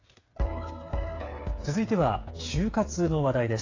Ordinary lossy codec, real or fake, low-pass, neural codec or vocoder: AAC, 32 kbps; fake; 7.2 kHz; codec, 16 kHz, 8 kbps, FreqCodec, smaller model